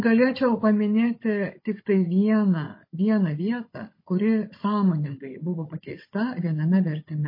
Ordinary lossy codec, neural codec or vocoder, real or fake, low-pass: MP3, 24 kbps; codec, 16 kHz, 16 kbps, FunCodec, trained on Chinese and English, 50 frames a second; fake; 5.4 kHz